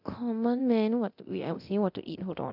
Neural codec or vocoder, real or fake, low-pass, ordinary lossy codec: codec, 24 kHz, 0.9 kbps, DualCodec; fake; 5.4 kHz; none